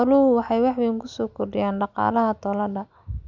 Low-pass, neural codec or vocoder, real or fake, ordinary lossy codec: 7.2 kHz; none; real; none